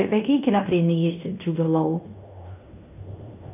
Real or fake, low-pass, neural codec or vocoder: fake; 3.6 kHz; codec, 16 kHz in and 24 kHz out, 0.9 kbps, LongCat-Audio-Codec, fine tuned four codebook decoder